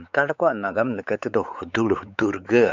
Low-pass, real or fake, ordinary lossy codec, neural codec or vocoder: 7.2 kHz; fake; none; codec, 16 kHz, 4 kbps, X-Codec, WavLM features, trained on Multilingual LibriSpeech